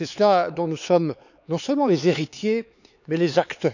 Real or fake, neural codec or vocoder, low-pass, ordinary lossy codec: fake; codec, 16 kHz, 4 kbps, X-Codec, HuBERT features, trained on LibriSpeech; 7.2 kHz; none